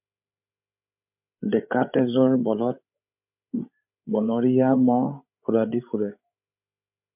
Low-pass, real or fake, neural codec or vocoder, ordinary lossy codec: 3.6 kHz; fake; codec, 16 kHz, 8 kbps, FreqCodec, larger model; MP3, 32 kbps